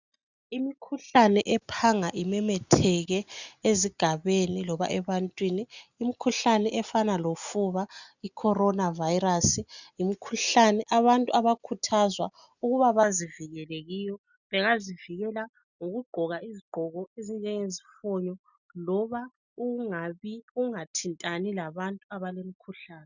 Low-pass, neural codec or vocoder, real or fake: 7.2 kHz; none; real